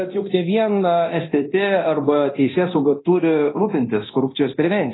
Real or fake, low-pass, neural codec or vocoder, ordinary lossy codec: fake; 7.2 kHz; codec, 24 kHz, 0.9 kbps, DualCodec; AAC, 16 kbps